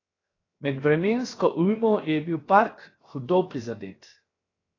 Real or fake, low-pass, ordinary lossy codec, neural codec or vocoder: fake; 7.2 kHz; AAC, 32 kbps; codec, 16 kHz, 0.7 kbps, FocalCodec